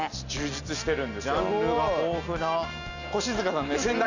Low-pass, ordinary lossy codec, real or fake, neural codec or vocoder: 7.2 kHz; none; real; none